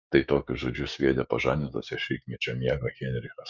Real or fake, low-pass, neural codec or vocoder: fake; 7.2 kHz; codec, 16 kHz, 6 kbps, DAC